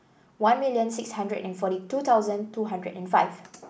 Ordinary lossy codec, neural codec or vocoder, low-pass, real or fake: none; none; none; real